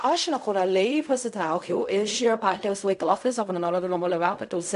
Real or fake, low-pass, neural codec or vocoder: fake; 10.8 kHz; codec, 16 kHz in and 24 kHz out, 0.4 kbps, LongCat-Audio-Codec, fine tuned four codebook decoder